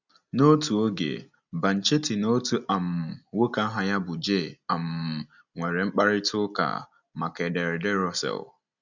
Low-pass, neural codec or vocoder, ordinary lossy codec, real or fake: 7.2 kHz; none; none; real